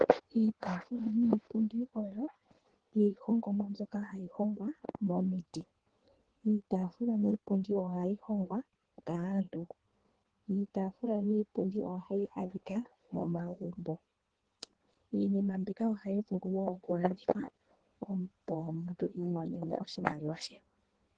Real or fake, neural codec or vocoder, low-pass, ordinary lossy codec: fake; codec, 16 kHz in and 24 kHz out, 1.1 kbps, FireRedTTS-2 codec; 9.9 kHz; Opus, 16 kbps